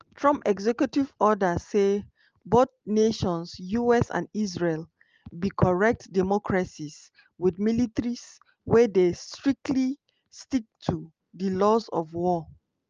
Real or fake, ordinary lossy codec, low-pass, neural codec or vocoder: real; Opus, 24 kbps; 7.2 kHz; none